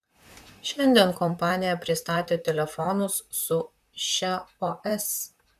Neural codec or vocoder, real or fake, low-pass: vocoder, 44.1 kHz, 128 mel bands, Pupu-Vocoder; fake; 14.4 kHz